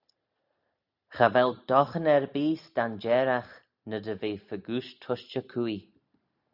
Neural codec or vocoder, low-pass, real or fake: none; 5.4 kHz; real